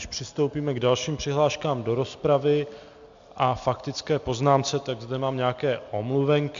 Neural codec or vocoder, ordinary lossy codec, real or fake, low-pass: none; MP3, 64 kbps; real; 7.2 kHz